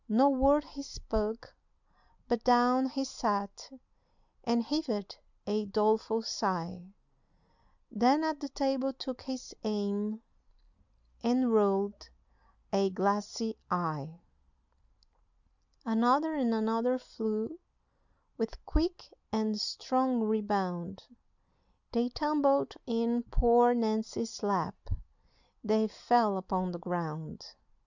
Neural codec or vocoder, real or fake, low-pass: none; real; 7.2 kHz